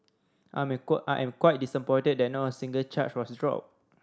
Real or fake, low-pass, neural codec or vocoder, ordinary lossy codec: real; none; none; none